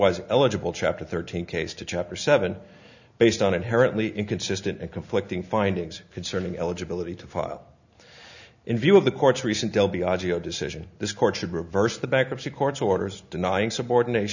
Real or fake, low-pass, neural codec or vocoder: real; 7.2 kHz; none